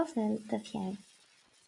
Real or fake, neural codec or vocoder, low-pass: real; none; 10.8 kHz